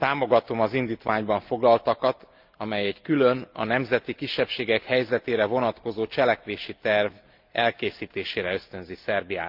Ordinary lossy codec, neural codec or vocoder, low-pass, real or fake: Opus, 24 kbps; none; 5.4 kHz; real